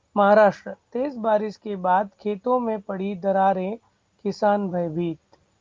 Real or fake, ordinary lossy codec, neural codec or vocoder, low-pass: real; Opus, 24 kbps; none; 7.2 kHz